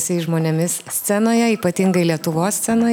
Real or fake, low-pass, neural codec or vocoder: real; 19.8 kHz; none